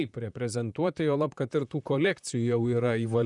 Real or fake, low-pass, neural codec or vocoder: fake; 10.8 kHz; vocoder, 44.1 kHz, 128 mel bands, Pupu-Vocoder